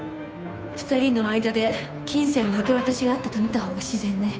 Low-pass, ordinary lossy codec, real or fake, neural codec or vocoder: none; none; fake; codec, 16 kHz, 2 kbps, FunCodec, trained on Chinese and English, 25 frames a second